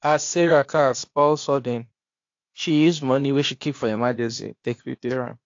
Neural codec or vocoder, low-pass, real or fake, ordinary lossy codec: codec, 16 kHz, 0.8 kbps, ZipCodec; 7.2 kHz; fake; AAC, 48 kbps